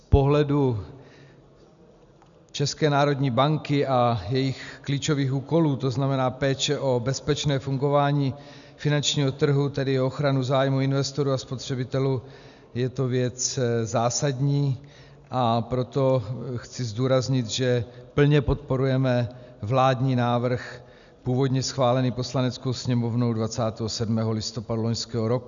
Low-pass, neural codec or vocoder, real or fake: 7.2 kHz; none; real